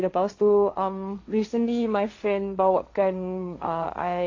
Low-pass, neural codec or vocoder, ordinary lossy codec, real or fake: 7.2 kHz; codec, 16 kHz, 1.1 kbps, Voila-Tokenizer; AAC, 48 kbps; fake